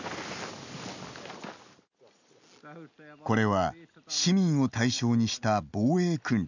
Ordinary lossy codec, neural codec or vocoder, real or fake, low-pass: none; none; real; 7.2 kHz